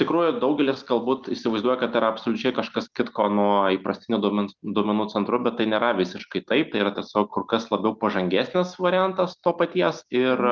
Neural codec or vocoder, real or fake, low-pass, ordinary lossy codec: none; real; 7.2 kHz; Opus, 32 kbps